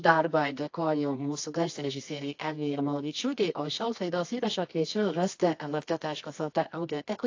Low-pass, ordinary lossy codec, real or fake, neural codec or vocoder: 7.2 kHz; AAC, 48 kbps; fake; codec, 24 kHz, 0.9 kbps, WavTokenizer, medium music audio release